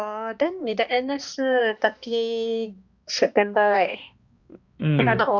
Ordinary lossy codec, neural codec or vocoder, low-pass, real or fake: Opus, 64 kbps; codec, 16 kHz, 2 kbps, X-Codec, HuBERT features, trained on balanced general audio; 7.2 kHz; fake